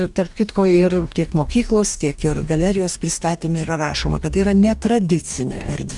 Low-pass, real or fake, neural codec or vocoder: 10.8 kHz; fake; codec, 44.1 kHz, 2.6 kbps, DAC